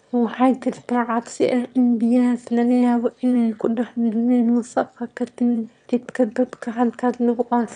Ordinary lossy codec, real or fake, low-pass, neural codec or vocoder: none; fake; 9.9 kHz; autoencoder, 22.05 kHz, a latent of 192 numbers a frame, VITS, trained on one speaker